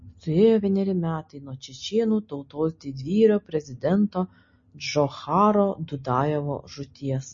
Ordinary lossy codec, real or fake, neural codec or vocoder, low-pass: MP3, 32 kbps; real; none; 7.2 kHz